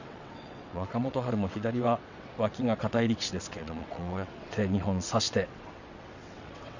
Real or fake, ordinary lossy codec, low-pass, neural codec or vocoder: fake; none; 7.2 kHz; vocoder, 22.05 kHz, 80 mel bands, WaveNeXt